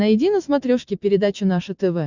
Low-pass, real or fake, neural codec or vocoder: 7.2 kHz; real; none